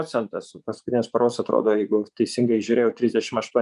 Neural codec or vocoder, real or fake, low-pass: vocoder, 24 kHz, 100 mel bands, Vocos; fake; 10.8 kHz